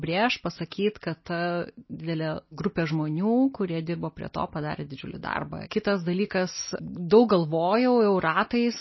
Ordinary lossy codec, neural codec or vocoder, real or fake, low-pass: MP3, 24 kbps; none; real; 7.2 kHz